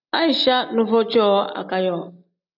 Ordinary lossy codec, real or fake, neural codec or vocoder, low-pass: AAC, 48 kbps; real; none; 5.4 kHz